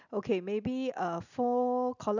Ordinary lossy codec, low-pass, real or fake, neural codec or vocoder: none; 7.2 kHz; real; none